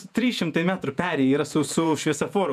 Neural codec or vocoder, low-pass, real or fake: vocoder, 48 kHz, 128 mel bands, Vocos; 14.4 kHz; fake